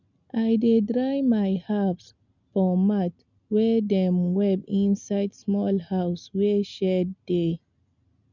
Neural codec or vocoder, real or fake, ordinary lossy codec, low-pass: none; real; none; 7.2 kHz